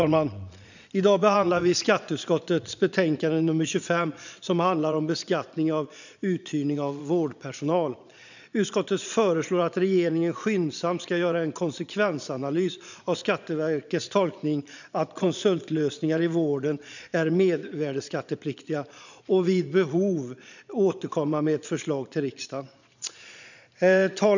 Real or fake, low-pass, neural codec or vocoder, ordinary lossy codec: fake; 7.2 kHz; vocoder, 22.05 kHz, 80 mel bands, Vocos; none